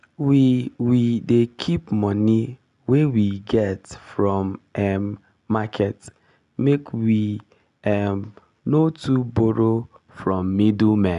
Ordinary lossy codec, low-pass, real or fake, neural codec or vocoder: none; 10.8 kHz; real; none